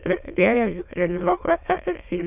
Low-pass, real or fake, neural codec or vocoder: 3.6 kHz; fake; autoencoder, 22.05 kHz, a latent of 192 numbers a frame, VITS, trained on many speakers